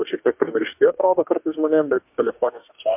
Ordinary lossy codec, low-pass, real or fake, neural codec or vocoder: MP3, 32 kbps; 3.6 kHz; fake; codec, 44.1 kHz, 2.6 kbps, DAC